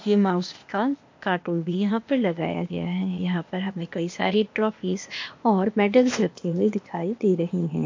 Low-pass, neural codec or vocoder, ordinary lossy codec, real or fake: 7.2 kHz; codec, 16 kHz, 0.8 kbps, ZipCodec; MP3, 48 kbps; fake